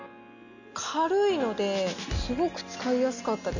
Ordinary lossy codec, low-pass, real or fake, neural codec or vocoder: none; 7.2 kHz; real; none